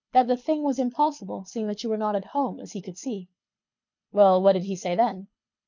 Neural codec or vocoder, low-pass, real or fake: codec, 24 kHz, 6 kbps, HILCodec; 7.2 kHz; fake